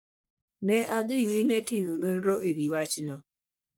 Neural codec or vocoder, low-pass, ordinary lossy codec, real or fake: codec, 44.1 kHz, 1.7 kbps, Pupu-Codec; none; none; fake